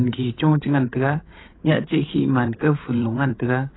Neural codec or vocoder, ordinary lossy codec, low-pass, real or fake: codec, 16 kHz, 8 kbps, FreqCodec, larger model; AAC, 16 kbps; 7.2 kHz; fake